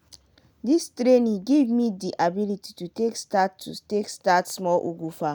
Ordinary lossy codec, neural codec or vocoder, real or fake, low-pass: none; none; real; none